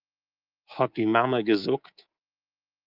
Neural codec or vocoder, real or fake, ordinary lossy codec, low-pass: codec, 24 kHz, 3.1 kbps, DualCodec; fake; Opus, 24 kbps; 5.4 kHz